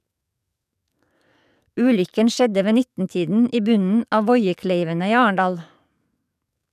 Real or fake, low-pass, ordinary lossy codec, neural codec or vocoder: fake; 14.4 kHz; none; codec, 44.1 kHz, 7.8 kbps, DAC